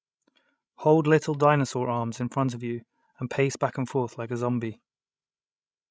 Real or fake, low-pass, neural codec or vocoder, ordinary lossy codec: fake; none; codec, 16 kHz, 16 kbps, FreqCodec, larger model; none